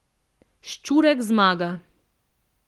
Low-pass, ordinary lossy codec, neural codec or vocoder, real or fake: 19.8 kHz; Opus, 24 kbps; codec, 44.1 kHz, 7.8 kbps, Pupu-Codec; fake